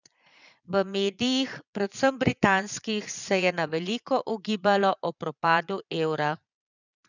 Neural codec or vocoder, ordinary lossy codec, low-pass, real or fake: vocoder, 22.05 kHz, 80 mel bands, Vocos; none; 7.2 kHz; fake